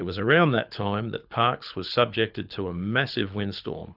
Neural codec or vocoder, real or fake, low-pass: codec, 24 kHz, 6 kbps, HILCodec; fake; 5.4 kHz